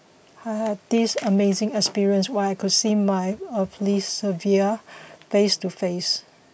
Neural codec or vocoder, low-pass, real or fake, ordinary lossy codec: none; none; real; none